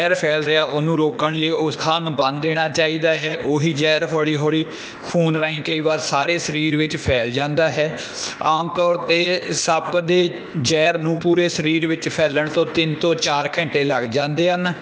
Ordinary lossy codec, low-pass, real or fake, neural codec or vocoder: none; none; fake; codec, 16 kHz, 0.8 kbps, ZipCodec